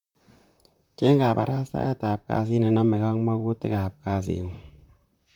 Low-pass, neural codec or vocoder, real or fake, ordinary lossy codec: 19.8 kHz; vocoder, 44.1 kHz, 128 mel bands, Pupu-Vocoder; fake; none